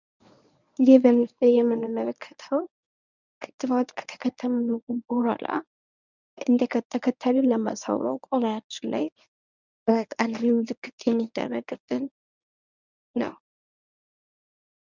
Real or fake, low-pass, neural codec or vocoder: fake; 7.2 kHz; codec, 24 kHz, 0.9 kbps, WavTokenizer, medium speech release version 1